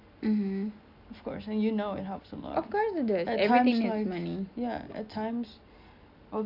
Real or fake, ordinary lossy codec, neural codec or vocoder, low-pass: real; none; none; 5.4 kHz